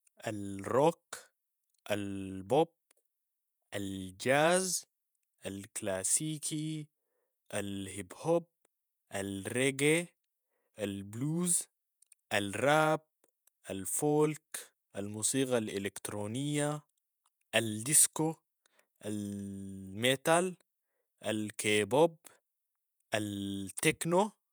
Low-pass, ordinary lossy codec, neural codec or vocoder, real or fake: none; none; vocoder, 48 kHz, 128 mel bands, Vocos; fake